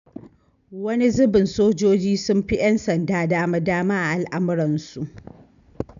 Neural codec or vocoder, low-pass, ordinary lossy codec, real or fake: none; 7.2 kHz; none; real